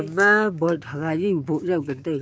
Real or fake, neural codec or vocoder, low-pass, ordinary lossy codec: fake; codec, 16 kHz, 6 kbps, DAC; none; none